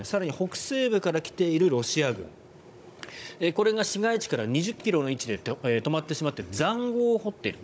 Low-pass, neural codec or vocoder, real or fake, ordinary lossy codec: none; codec, 16 kHz, 16 kbps, FunCodec, trained on Chinese and English, 50 frames a second; fake; none